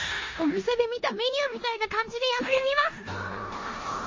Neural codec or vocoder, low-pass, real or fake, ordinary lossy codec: codec, 16 kHz in and 24 kHz out, 0.9 kbps, LongCat-Audio-Codec, four codebook decoder; 7.2 kHz; fake; MP3, 32 kbps